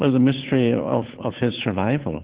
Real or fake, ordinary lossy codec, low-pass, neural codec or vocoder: fake; Opus, 16 kbps; 3.6 kHz; codec, 16 kHz, 2 kbps, FunCodec, trained on Chinese and English, 25 frames a second